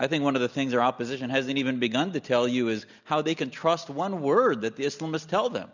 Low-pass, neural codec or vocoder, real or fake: 7.2 kHz; none; real